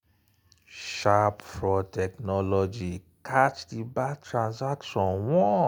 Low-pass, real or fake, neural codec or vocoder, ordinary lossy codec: none; real; none; none